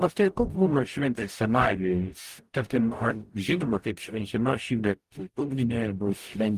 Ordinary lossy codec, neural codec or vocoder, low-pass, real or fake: Opus, 24 kbps; codec, 44.1 kHz, 0.9 kbps, DAC; 14.4 kHz; fake